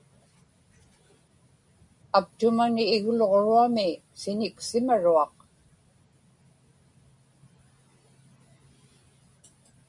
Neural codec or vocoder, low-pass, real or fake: none; 10.8 kHz; real